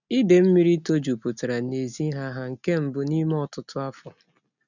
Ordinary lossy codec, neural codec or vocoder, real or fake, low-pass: none; none; real; 7.2 kHz